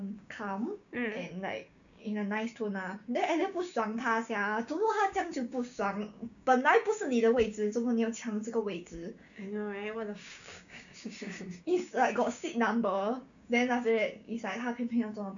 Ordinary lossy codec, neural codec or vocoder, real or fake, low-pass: none; codec, 16 kHz, 6 kbps, DAC; fake; 7.2 kHz